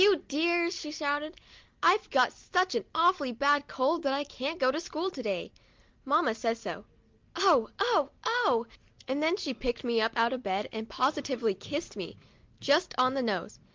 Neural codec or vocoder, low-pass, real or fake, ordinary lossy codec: none; 7.2 kHz; real; Opus, 32 kbps